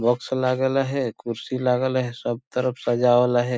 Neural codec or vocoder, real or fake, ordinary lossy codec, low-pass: none; real; none; none